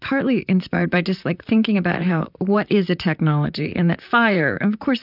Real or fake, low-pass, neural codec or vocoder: fake; 5.4 kHz; vocoder, 44.1 kHz, 128 mel bands, Pupu-Vocoder